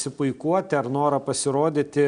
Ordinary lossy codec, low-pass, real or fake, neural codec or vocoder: MP3, 96 kbps; 9.9 kHz; real; none